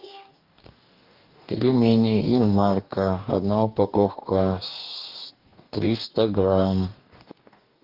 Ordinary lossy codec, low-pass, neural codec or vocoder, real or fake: Opus, 32 kbps; 5.4 kHz; codec, 44.1 kHz, 2.6 kbps, DAC; fake